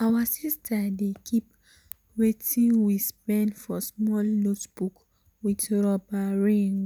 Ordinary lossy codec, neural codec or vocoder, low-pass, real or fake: none; none; none; real